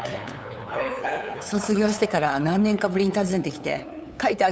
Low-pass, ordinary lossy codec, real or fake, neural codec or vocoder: none; none; fake; codec, 16 kHz, 8 kbps, FunCodec, trained on LibriTTS, 25 frames a second